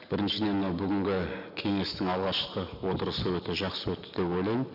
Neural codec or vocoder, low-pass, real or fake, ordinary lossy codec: none; 5.4 kHz; real; none